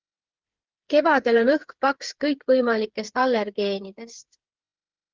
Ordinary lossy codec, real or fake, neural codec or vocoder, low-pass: Opus, 16 kbps; fake; codec, 16 kHz, 8 kbps, FreqCodec, smaller model; 7.2 kHz